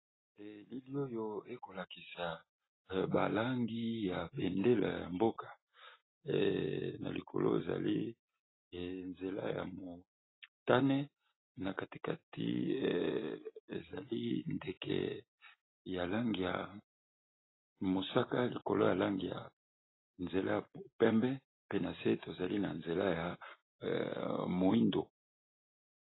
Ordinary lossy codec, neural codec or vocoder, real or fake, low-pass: AAC, 16 kbps; none; real; 7.2 kHz